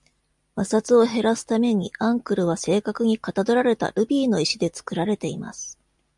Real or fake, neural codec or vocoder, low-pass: real; none; 10.8 kHz